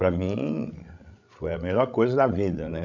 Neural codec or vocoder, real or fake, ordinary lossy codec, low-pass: codec, 16 kHz, 16 kbps, FreqCodec, larger model; fake; none; 7.2 kHz